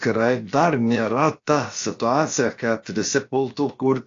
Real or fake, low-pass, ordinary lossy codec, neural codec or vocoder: fake; 7.2 kHz; AAC, 48 kbps; codec, 16 kHz, about 1 kbps, DyCAST, with the encoder's durations